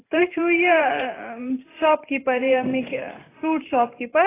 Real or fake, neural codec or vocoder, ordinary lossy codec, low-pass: fake; vocoder, 44.1 kHz, 128 mel bands every 512 samples, BigVGAN v2; AAC, 16 kbps; 3.6 kHz